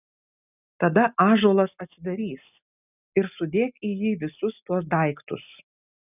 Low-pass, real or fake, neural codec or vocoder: 3.6 kHz; real; none